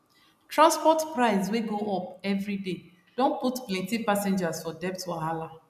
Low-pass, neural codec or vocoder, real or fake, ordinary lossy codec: 14.4 kHz; none; real; none